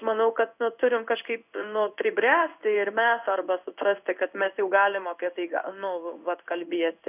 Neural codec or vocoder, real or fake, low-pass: codec, 16 kHz in and 24 kHz out, 1 kbps, XY-Tokenizer; fake; 3.6 kHz